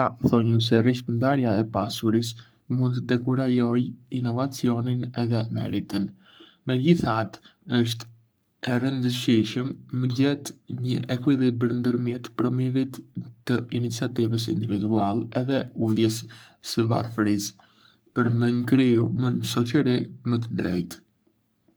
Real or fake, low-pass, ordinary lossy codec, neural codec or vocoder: fake; none; none; codec, 44.1 kHz, 3.4 kbps, Pupu-Codec